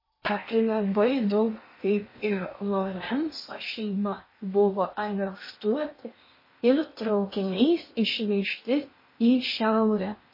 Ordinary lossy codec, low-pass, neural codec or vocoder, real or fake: MP3, 24 kbps; 5.4 kHz; codec, 16 kHz in and 24 kHz out, 0.8 kbps, FocalCodec, streaming, 65536 codes; fake